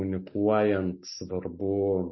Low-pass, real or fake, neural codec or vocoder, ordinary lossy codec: 7.2 kHz; real; none; MP3, 24 kbps